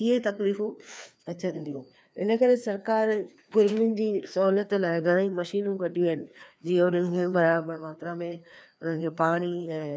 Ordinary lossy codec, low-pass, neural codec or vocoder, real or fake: none; none; codec, 16 kHz, 2 kbps, FreqCodec, larger model; fake